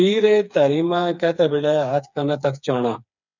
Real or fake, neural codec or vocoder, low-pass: fake; codec, 16 kHz, 4 kbps, FreqCodec, smaller model; 7.2 kHz